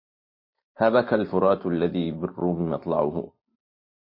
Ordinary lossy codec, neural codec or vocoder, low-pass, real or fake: MP3, 24 kbps; none; 5.4 kHz; real